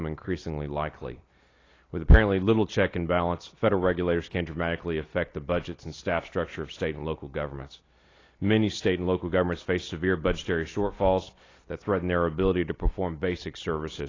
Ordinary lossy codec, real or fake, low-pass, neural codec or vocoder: AAC, 32 kbps; real; 7.2 kHz; none